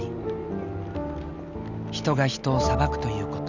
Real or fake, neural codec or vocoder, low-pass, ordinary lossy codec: real; none; 7.2 kHz; none